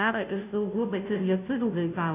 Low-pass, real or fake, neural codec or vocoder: 3.6 kHz; fake; codec, 16 kHz, 0.5 kbps, FunCodec, trained on Chinese and English, 25 frames a second